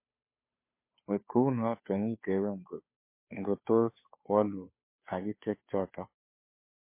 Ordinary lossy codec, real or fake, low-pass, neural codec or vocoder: MP3, 24 kbps; fake; 3.6 kHz; codec, 16 kHz, 2 kbps, FunCodec, trained on Chinese and English, 25 frames a second